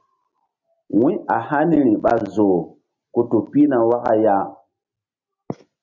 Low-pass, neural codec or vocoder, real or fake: 7.2 kHz; none; real